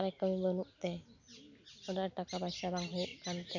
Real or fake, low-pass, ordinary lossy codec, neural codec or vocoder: real; 7.2 kHz; none; none